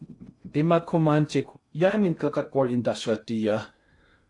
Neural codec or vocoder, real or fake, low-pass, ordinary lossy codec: codec, 16 kHz in and 24 kHz out, 0.6 kbps, FocalCodec, streaming, 2048 codes; fake; 10.8 kHz; AAC, 48 kbps